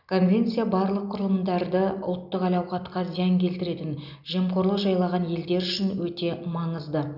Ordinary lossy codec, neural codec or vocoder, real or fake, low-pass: none; none; real; 5.4 kHz